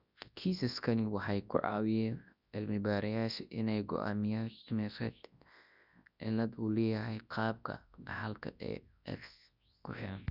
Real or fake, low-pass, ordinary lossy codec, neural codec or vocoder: fake; 5.4 kHz; Opus, 64 kbps; codec, 24 kHz, 0.9 kbps, WavTokenizer, large speech release